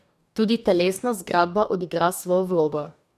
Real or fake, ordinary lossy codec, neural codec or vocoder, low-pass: fake; none; codec, 44.1 kHz, 2.6 kbps, DAC; 14.4 kHz